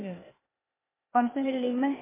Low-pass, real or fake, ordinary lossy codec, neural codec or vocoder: 3.6 kHz; fake; MP3, 24 kbps; codec, 16 kHz, 0.8 kbps, ZipCodec